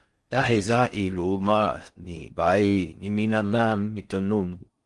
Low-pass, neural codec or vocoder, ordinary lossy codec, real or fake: 10.8 kHz; codec, 16 kHz in and 24 kHz out, 0.6 kbps, FocalCodec, streaming, 4096 codes; Opus, 32 kbps; fake